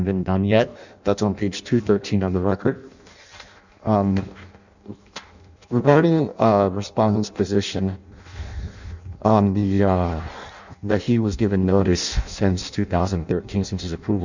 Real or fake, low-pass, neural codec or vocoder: fake; 7.2 kHz; codec, 16 kHz in and 24 kHz out, 0.6 kbps, FireRedTTS-2 codec